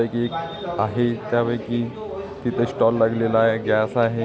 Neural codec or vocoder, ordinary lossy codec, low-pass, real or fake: none; none; none; real